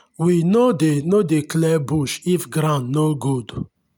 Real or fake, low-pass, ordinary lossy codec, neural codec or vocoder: real; none; none; none